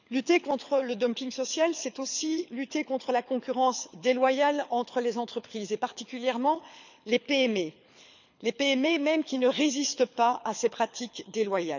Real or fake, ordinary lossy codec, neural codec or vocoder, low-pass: fake; none; codec, 24 kHz, 6 kbps, HILCodec; 7.2 kHz